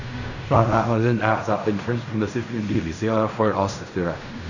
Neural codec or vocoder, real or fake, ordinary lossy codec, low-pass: codec, 16 kHz in and 24 kHz out, 0.4 kbps, LongCat-Audio-Codec, fine tuned four codebook decoder; fake; none; 7.2 kHz